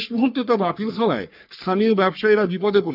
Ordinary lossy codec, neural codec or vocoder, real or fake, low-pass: none; codec, 44.1 kHz, 3.4 kbps, Pupu-Codec; fake; 5.4 kHz